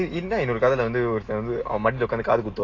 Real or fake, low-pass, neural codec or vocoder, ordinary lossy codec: real; 7.2 kHz; none; AAC, 32 kbps